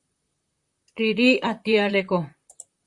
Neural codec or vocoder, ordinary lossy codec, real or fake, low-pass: vocoder, 44.1 kHz, 128 mel bands, Pupu-Vocoder; Opus, 64 kbps; fake; 10.8 kHz